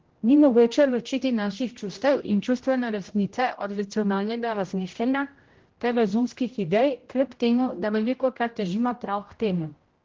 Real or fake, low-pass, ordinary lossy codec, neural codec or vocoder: fake; 7.2 kHz; Opus, 16 kbps; codec, 16 kHz, 0.5 kbps, X-Codec, HuBERT features, trained on general audio